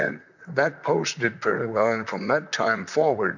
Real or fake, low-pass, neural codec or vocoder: fake; 7.2 kHz; vocoder, 44.1 kHz, 128 mel bands, Pupu-Vocoder